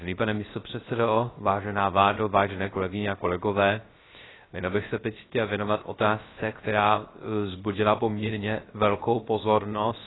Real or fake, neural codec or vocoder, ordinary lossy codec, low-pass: fake; codec, 16 kHz, 0.3 kbps, FocalCodec; AAC, 16 kbps; 7.2 kHz